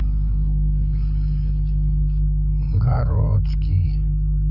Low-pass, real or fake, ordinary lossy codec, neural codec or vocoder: 5.4 kHz; fake; none; codec, 16 kHz, 8 kbps, FreqCodec, larger model